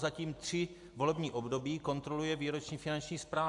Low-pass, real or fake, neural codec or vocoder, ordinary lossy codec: 10.8 kHz; real; none; AAC, 64 kbps